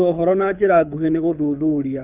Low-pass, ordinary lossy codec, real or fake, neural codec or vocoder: 3.6 kHz; none; fake; codec, 24 kHz, 6 kbps, HILCodec